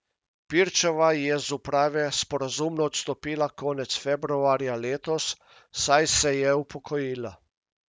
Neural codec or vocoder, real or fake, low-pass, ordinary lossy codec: none; real; none; none